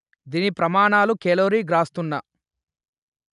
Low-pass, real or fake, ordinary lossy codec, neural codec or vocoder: 10.8 kHz; real; none; none